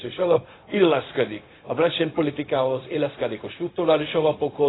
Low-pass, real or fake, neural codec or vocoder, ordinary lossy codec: 7.2 kHz; fake; codec, 16 kHz, 0.4 kbps, LongCat-Audio-Codec; AAC, 16 kbps